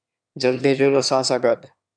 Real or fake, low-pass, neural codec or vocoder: fake; 9.9 kHz; autoencoder, 22.05 kHz, a latent of 192 numbers a frame, VITS, trained on one speaker